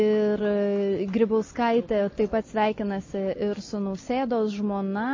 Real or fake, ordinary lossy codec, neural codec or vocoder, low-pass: real; MP3, 32 kbps; none; 7.2 kHz